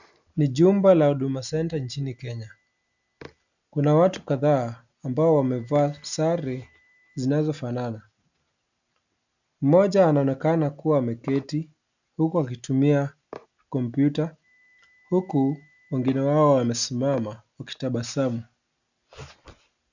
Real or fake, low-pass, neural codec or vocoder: real; 7.2 kHz; none